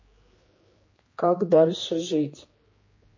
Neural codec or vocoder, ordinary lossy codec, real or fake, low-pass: codec, 16 kHz, 2 kbps, X-Codec, HuBERT features, trained on general audio; MP3, 32 kbps; fake; 7.2 kHz